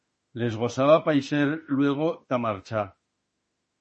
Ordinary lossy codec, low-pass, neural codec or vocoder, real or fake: MP3, 32 kbps; 10.8 kHz; autoencoder, 48 kHz, 32 numbers a frame, DAC-VAE, trained on Japanese speech; fake